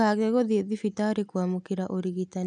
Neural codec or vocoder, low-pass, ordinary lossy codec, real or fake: none; 10.8 kHz; none; real